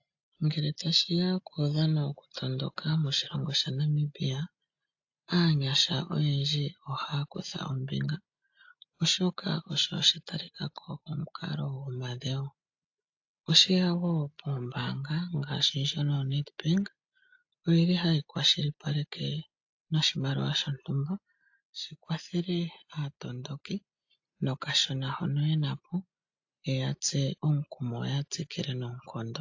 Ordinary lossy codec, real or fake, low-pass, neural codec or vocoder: AAC, 48 kbps; real; 7.2 kHz; none